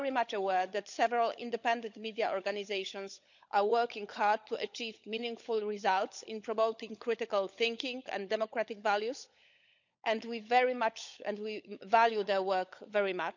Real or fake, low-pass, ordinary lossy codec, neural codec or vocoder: fake; 7.2 kHz; none; codec, 16 kHz, 16 kbps, FunCodec, trained on LibriTTS, 50 frames a second